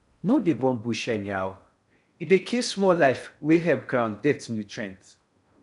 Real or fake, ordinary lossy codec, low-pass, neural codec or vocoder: fake; none; 10.8 kHz; codec, 16 kHz in and 24 kHz out, 0.6 kbps, FocalCodec, streaming, 4096 codes